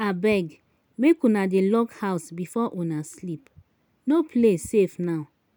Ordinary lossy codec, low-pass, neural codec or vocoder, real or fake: none; none; none; real